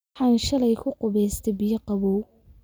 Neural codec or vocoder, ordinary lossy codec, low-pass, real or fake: none; none; none; real